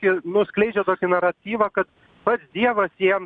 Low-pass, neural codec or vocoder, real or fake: 9.9 kHz; none; real